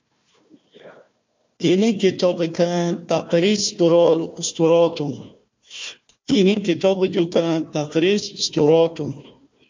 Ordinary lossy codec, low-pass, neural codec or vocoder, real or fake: MP3, 48 kbps; 7.2 kHz; codec, 16 kHz, 1 kbps, FunCodec, trained on Chinese and English, 50 frames a second; fake